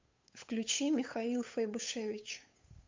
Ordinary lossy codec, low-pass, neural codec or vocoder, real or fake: MP3, 64 kbps; 7.2 kHz; codec, 16 kHz, 8 kbps, FunCodec, trained on Chinese and English, 25 frames a second; fake